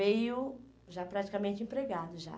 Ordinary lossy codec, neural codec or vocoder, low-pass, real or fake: none; none; none; real